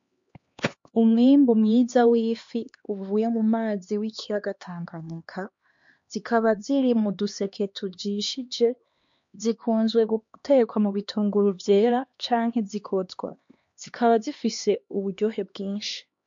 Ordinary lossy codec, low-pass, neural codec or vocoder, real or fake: MP3, 48 kbps; 7.2 kHz; codec, 16 kHz, 2 kbps, X-Codec, HuBERT features, trained on LibriSpeech; fake